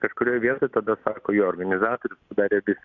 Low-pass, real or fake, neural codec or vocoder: 7.2 kHz; real; none